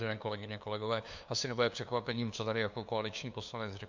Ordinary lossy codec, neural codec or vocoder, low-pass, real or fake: MP3, 64 kbps; codec, 16 kHz, 2 kbps, FunCodec, trained on LibriTTS, 25 frames a second; 7.2 kHz; fake